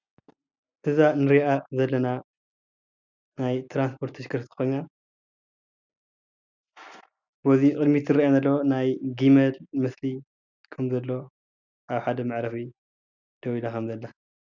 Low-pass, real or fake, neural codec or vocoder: 7.2 kHz; real; none